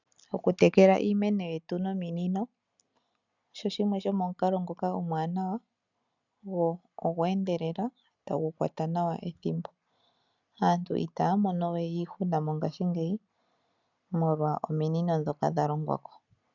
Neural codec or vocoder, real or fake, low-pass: none; real; 7.2 kHz